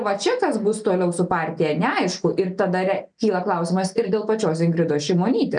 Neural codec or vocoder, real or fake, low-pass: none; real; 9.9 kHz